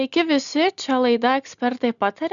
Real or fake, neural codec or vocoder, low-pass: real; none; 7.2 kHz